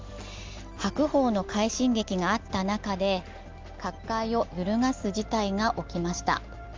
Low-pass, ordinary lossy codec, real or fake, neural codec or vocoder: 7.2 kHz; Opus, 32 kbps; real; none